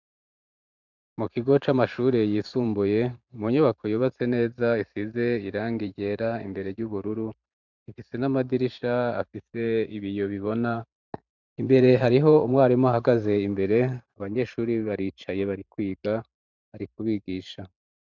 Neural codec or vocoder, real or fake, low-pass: vocoder, 44.1 kHz, 128 mel bands every 512 samples, BigVGAN v2; fake; 7.2 kHz